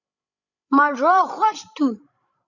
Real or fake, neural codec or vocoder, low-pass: fake; codec, 16 kHz, 16 kbps, FreqCodec, larger model; 7.2 kHz